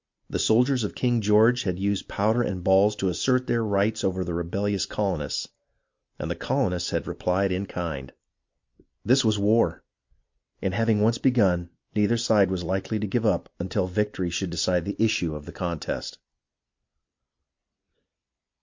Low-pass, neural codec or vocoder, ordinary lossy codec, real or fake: 7.2 kHz; none; MP3, 48 kbps; real